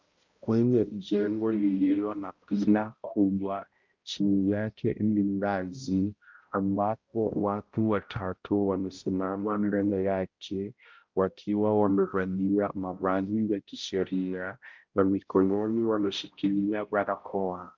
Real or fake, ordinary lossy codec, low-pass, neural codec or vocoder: fake; Opus, 32 kbps; 7.2 kHz; codec, 16 kHz, 0.5 kbps, X-Codec, HuBERT features, trained on balanced general audio